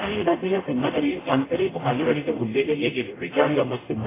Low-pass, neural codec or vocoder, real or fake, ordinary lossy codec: 3.6 kHz; codec, 44.1 kHz, 0.9 kbps, DAC; fake; AAC, 16 kbps